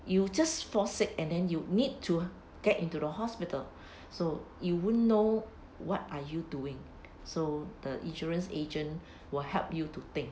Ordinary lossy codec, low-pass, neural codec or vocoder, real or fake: none; none; none; real